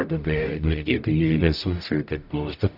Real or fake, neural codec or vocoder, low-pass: fake; codec, 44.1 kHz, 0.9 kbps, DAC; 5.4 kHz